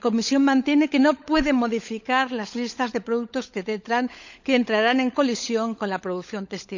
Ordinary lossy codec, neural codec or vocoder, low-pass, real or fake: none; codec, 16 kHz, 16 kbps, FunCodec, trained on LibriTTS, 50 frames a second; 7.2 kHz; fake